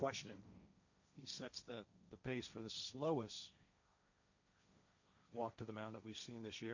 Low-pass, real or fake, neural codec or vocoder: 7.2 kHz; fake; codec, 16 kHz, 1.1 kbps, Voila-Tokenizer